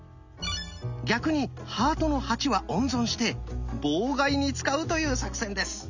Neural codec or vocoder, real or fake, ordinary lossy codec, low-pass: none; real; none; 7.2 kHz